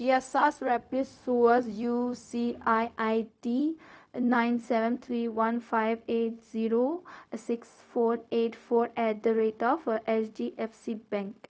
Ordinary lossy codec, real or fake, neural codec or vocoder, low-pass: none; fake; codec, 16 kHz, 0.4 kbps, LongCat-Audio-Codec; none